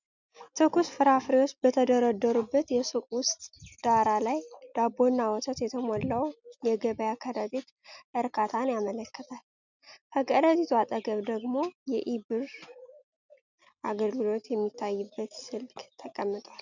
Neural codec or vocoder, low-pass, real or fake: none; 7.2 kHz; real